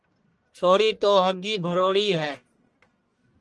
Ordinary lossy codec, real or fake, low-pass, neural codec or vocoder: Opus, 24 kbps; fake; 10.8 kHz; codec, 44.1 kHz, 1.7 kbps, Pupu-Codec